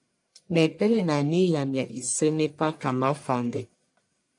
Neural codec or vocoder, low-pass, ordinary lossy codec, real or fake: codec, 44.1 kHz, 1.7 kbps, Pupu-Codec; 10.8 kHz; AAC, 64 kbps; fake